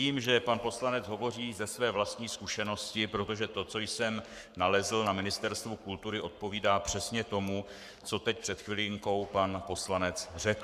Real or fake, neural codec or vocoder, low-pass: fake; codec, 44.1 kHz, 7.8 kbps, Pupu-Codec; 14.4 kHz